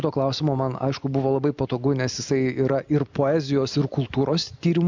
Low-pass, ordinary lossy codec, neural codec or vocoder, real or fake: 7.2 kHz; MP3, 64 kbps; none; real